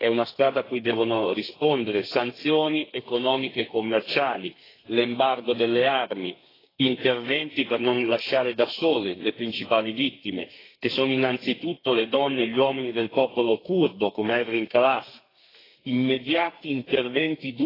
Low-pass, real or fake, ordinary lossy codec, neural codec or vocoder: 5.4 kHz; fake; AAC, 24 kbps; codec, 32 kHz, 1.9 kbps, SNAC